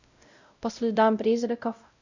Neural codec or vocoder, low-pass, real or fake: codec, 16 kHz, 0.5 kbps, X-Codec, WavLM features, trained on Multilingual LibriSpeech; 7.2 kHz; fake